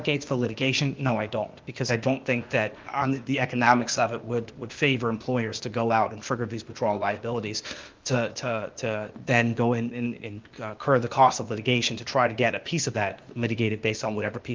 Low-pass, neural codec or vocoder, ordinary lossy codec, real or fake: 7.2 kHz; codec, 16 kHz, 0.8 kbps, ZipCodec; Opus, 24 kbps; fake